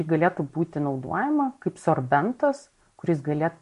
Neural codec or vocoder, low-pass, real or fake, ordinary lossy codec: none; 10.8 kHz; real; MP3, 48 kbps